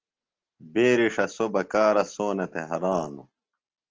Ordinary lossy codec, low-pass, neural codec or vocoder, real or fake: Opus, 16 kbps; 7.2 kHz; none; real